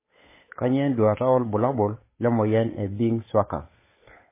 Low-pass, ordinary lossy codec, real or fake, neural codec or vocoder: 3.6 kHz; MP3, 16 kbps; fake; codec, 16 kHz, 8 kbps, FunCodec, trained on Chinese and English, 25 frames a second